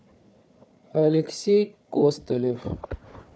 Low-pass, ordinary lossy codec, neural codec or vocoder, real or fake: none; none; codec, 16 kHz, 4 kbps, FunCodec, trained on Chinese and English, 50 frames a second; fake